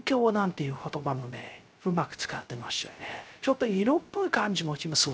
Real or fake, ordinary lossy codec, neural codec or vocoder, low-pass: fake; none; codec, 16 kHz, 0.3 kbps, FocalCodec; none